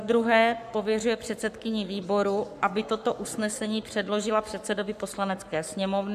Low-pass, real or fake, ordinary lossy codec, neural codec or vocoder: 14.4 kHz; fake; AAC, 96 kbps; codec, 44.1 kHz, 7.8 kbps, Pupu-Codec